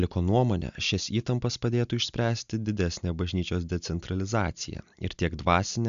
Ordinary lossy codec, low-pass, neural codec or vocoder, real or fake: AAC, 96 kbps; 7.2 kHz; none; real